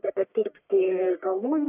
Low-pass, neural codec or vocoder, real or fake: 3.6 kHz; codec, 44.1 kHz, 1.7 kbps, Pupu-Codec; fake